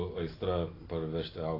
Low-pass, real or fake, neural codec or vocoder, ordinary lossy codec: 5.4 kHz; real; none; AAC, 24 kbps